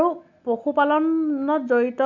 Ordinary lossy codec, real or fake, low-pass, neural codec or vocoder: none; real; 7.2 kHz; none